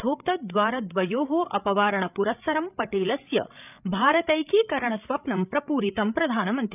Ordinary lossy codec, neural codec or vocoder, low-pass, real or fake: none; codec, 16 kHz, 8 kbps, FreqCodec, larger model; 3.6 kHz; fake